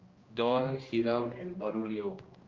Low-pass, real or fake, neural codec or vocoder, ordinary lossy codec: 7.2 kHz; fake; codec, 16 kHz, 1 kbps, X-Codec, HuBERT features, trained on general audio; Opus, 32 kbps